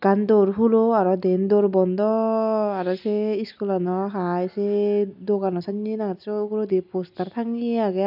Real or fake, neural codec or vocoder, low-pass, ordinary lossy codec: real; none; 5.4 kHz; none